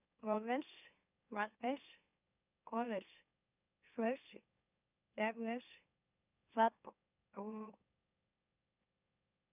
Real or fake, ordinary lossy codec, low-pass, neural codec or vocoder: fake; none; 3.6 kHz; autoencoder, 44.1 kHz, a latent of 192 numbers a frame, MeloTTS